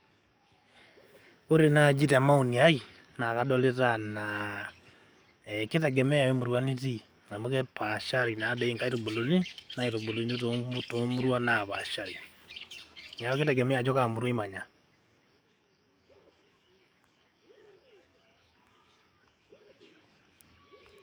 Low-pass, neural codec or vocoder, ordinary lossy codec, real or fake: none; codec, 44.1 kHz, 7.8 kbps, DAC; none; fake